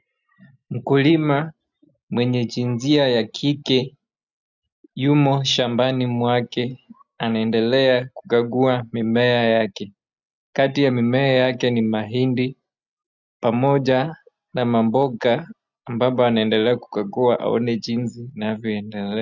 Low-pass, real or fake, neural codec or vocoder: 7.2 kHz; real; none